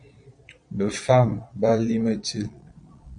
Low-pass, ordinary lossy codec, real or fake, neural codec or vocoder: 9.9 kHz; AAC, 64 kbps; fake; vocoder, 22.05 kHz, 80 mel bands, Vocos